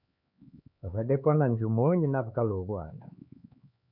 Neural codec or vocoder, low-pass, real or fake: codec, 16 kHz, 4 kbps, X-Codec, HuBERT features, trained on LibriSpeech; 5.4 kHz; fake